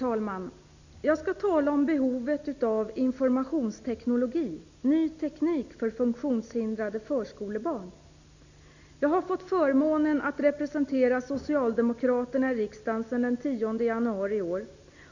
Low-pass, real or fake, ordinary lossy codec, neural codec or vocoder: 7.2 kHz; real; none; none